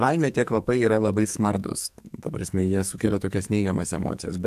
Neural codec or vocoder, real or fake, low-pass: codec, 44.1 kHz, 2.6 kbps, SNAC; fake; 14.4 kHz